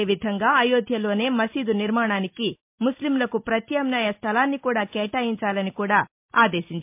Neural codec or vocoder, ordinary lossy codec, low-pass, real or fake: none; MP3, 32 kbps; 3.6 kHz; real